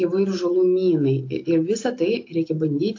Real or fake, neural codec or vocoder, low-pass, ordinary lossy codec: real; none; 7.2 kHz; AAC, 48 kbps